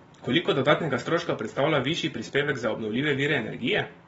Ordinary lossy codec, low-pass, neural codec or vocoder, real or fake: AAC, 24 kbps; 19.8 kHz; none; real